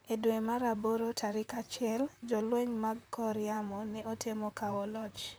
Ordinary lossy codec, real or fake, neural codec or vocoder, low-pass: none; fake; vocoder, 44.1 kHz, 128 mel bands, Pupu-Vocoder; none